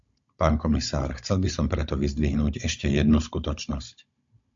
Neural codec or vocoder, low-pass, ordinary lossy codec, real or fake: codec, 16 kHz, 16 kbps, FunCodec, trained on Chinese and English, 50 frames a second; 7.2 kHz; MP3, 48 kbps; fake